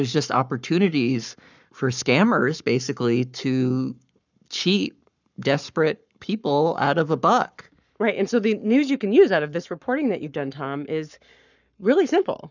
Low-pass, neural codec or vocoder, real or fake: 7.2 kHz; codec, 44.1 kHz, 7.8 kbps, Pupu-Codec; fake